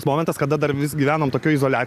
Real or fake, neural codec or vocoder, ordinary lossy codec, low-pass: real; none; AAC, 96 kbps; 14.4 kHz